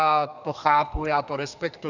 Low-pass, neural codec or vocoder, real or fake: 7.2 kHz; codec, 32 kHz, 1.9 kbps, SNAC; fake